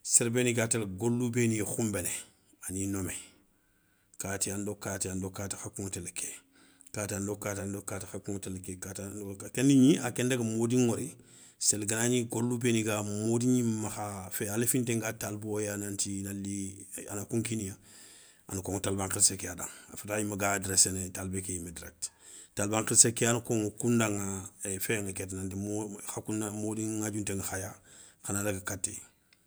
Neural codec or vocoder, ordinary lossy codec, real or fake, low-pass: none; none; real; none